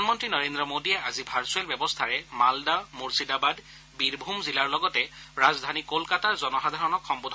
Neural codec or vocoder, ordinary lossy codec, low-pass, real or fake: none; none; none; real